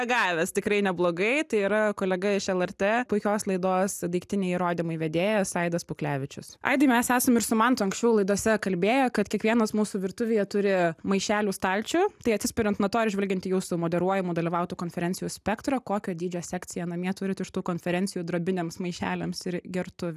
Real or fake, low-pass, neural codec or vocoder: real; 14.4 kHz; none